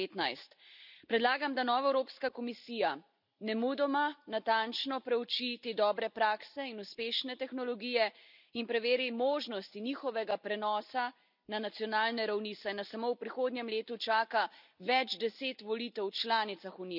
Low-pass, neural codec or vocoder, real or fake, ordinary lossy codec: 5.4 kHz; none; real; none